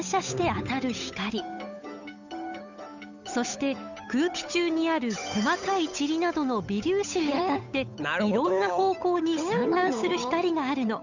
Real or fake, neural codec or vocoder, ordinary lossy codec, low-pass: fake; codec, 16 kHz, 8 kbps, FunCodec, trained on Chinese and English, 25 frames a second; none; 7.2 kHz